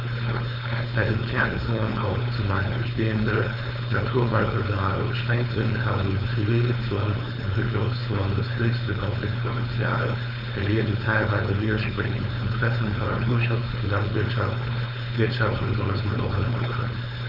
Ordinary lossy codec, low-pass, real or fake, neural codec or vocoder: none; 5.4 kHz; fake; codec, 16 kHz, 4.8 kbps, FACodec